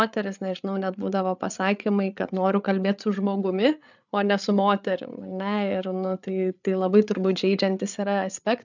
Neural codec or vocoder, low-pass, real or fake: codec, 16 kHz, 8 kbps, FreqCodec, larger model; 7.2 kHz; fake